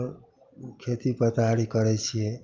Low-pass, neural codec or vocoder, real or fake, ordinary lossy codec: 7.2 kHz; none; real; Opus, 24 kbps